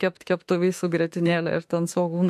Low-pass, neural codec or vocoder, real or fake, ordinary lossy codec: 14.4 kHz; autoencoder, 48 kHz, 32 numbers a frame, DAC-VAE, trained on Japanese speech; fake; MP3, 64 kbps